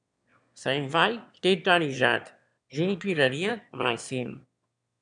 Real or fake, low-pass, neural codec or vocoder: fake; 9.9 kHz; autoencoder, 22.05 kHz, a latent of 192 numbers a frame, VITS, trained on one speaker